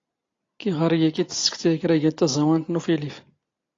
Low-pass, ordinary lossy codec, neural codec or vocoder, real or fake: 7.2 kHz; AAC, 32 kbps; none; real